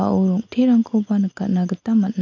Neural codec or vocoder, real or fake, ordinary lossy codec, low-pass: none; real; AAC, 48 kbps; 7.2 kHz